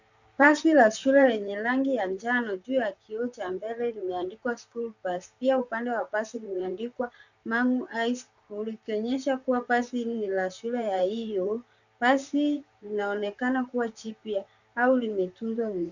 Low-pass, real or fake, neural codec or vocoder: 7.2 kHz; fake; vocoder, 44.1 kHz, 128 mel bands, Pupu-Vocoder